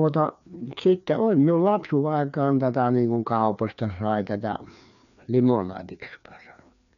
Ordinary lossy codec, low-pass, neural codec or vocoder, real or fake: none; 7.2 kHz; codec, 16 kHz, 2 kbps, FreqCodec, larger model; fake